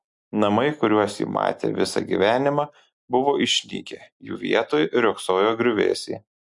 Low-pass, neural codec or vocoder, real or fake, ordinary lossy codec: 10.8 kHz; none; real; MP3, 64 kbps